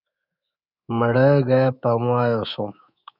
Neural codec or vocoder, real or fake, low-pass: codec, 24 kHz, 3.1 kbps, DualCodec; fake; 5.4 kHz